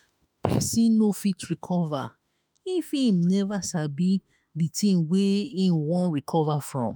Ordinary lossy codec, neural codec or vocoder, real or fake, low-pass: none; autoencoder, 48 kHz, 32 numbers a frame, DAC-VAE, trained on Japanese speech; fake; none